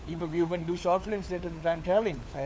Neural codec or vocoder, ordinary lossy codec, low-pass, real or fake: codec, 16 kHz, 4 kbps, FunCodec, trained on LibriTTS, 50 frames a second; none; none; fake